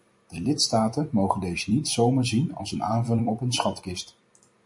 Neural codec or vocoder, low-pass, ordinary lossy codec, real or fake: vocoder, 44.1 kHz, 128 mel bands every 256 samples, BigVGAN v2; 10.8 kHz; MP3, 48 kbps; fake